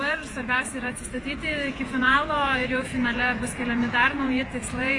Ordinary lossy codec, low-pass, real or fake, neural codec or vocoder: AAC, 48 kbps; 10.8 kHz; fake; vocoder, 48 kHz, 128 mel bands, Vocos